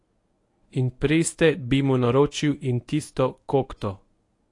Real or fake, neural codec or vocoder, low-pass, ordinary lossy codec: fake; codec, 24 kHz, 0.9 kbps, WavTokenizer, medium speech release version 1; 10.8 kHz; AAC, 48 kbps